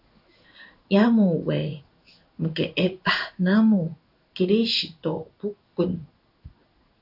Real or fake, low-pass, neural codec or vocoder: fake; 5.4 kHz; codec, 16 kHz in and 24 kHz out, 1 kbps, XY-Tokenizer